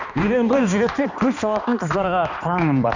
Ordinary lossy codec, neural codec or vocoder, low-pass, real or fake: none; codec, 16 kHz, 2 kbps, X-Codec, HuBERT features, trained on balanced general audio; 7.2 kHz; fake